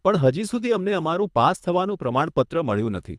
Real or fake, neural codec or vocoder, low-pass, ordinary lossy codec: fake; codec, 24 kHz, 3 kbps, HILCodec; none; none